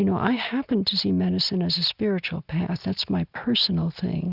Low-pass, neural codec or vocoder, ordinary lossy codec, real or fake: 5.4 kHz; none; Opus, 64 kbps; real